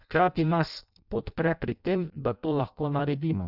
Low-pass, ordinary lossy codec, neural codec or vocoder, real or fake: 5.4 kHz; none; codec, 16 kHz in and 24 kHz out, 0.6 kbps, FireRedTTS-2 codec; fake